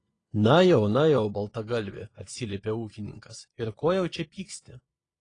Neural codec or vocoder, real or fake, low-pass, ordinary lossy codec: vocoder, 22.05 kHz, 80 mel bands, Vocos; fake; 9.9 kHz; AAC, 32 kbps